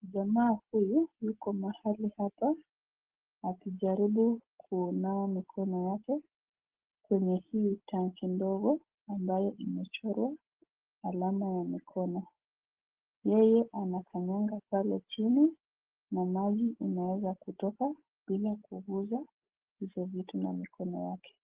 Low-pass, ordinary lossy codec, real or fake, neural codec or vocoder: 3.6 kHz; Opus, 16 kbps; real; none